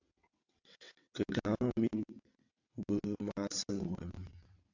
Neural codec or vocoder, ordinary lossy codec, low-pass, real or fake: vocoder, 22.05 kHz, 80 mel bands, WaveNeXt; AAC, 48 kbps; 7.2 kHz; fake